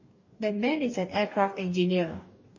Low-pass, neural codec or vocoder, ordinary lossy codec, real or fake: 7.2 kHz; codec, 44.1 kHz, 2.6 kbps, DAC; MP3, 32 kbps; fake